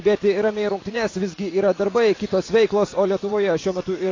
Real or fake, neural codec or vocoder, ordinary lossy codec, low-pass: fake; vocoder, 44.1 kHz, 80 mel bands, Vocos; AAC, 32 kbps; 7.2 kHz